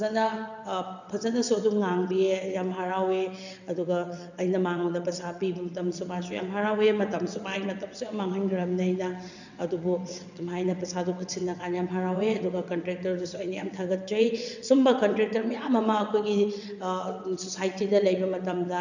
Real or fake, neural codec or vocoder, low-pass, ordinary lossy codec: fake; vocoder, 22.05 kHz, 80 mel bands, WaveNeXt; 7.2 kHz; none